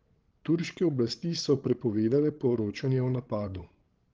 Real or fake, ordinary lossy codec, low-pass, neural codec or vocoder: fake; Opus, 16 kbps; 7.2 kHz; codec, 16 kHz, 16 kbps, FreqCodec, larger model